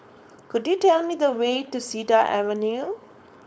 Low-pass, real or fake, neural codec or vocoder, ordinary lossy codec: none; fake; codec, 16 kHz, 4.8 kbps, FACodec; none